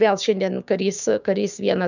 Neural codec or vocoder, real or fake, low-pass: codec, 16 kHz, 6 kbps, DAC; fake; 7.2 kHz